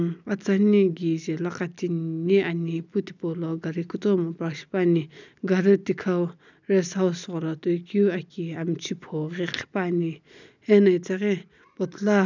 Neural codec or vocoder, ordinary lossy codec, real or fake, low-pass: none; none; real; 7.2 kHz